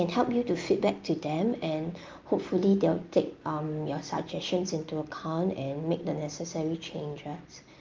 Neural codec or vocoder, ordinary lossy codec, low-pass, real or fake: none; Opus, 32 kbps; 7.2 kHz; real